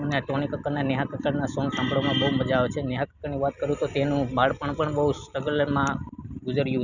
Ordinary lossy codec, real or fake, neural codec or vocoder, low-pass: none; real; none; 7.2 kHz